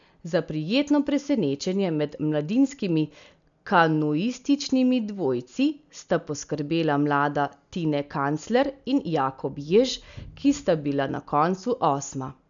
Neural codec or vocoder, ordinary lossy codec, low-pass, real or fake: none; none; 7.2 kHz; real